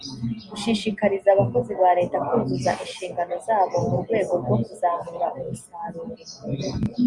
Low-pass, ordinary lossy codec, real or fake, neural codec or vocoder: 10.8 kHz; Opus, 64 kbps; real; none